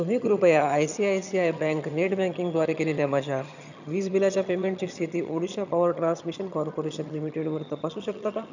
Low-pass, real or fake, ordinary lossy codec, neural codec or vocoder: 7.2 kHz; fake; none; vocoder, 22.05 kHz, 80 mel bands, HiFi-GAN